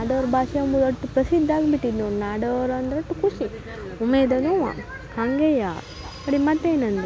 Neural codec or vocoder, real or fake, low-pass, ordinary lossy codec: none; real; none; none